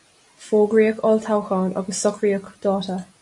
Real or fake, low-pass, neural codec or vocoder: real; 10.8 kHz; none